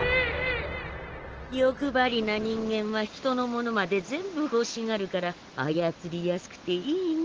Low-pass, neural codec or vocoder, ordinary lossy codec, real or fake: 7.2 kHz; none; Opus, 16 kbps; real